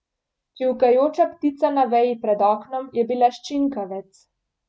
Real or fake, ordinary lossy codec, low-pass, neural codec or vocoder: real; none; none; none